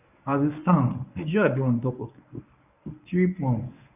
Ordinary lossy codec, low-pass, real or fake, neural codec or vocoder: none; 3.6 kHz; fake; codec, 24 kHz, 0.9 kbps, WavTokenizer, medium speech release version 1